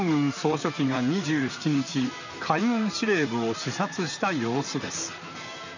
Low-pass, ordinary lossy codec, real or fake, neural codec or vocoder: 7.2 kHz; none; fake; vocoder, 44.1 kHz, 128 mel bands, Pupu-Vocoder